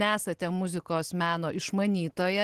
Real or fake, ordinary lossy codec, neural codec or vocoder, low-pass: real; Opus, 16 kbps; none; 14.4 kHz